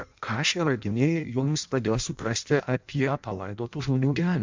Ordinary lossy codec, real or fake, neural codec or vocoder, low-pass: MP3, 64 kbps; fake; codec, 16 kHz in and 24 kHz out, 0.6 kbps, FireRedTTS-2 codec; 7.2 kHz